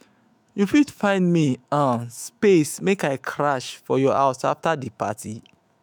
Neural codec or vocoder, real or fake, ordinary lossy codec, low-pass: autoencoder, 48 kHz, 128 numbers a frame, DAC-VAE, trained on Japanese speech; fake; none; none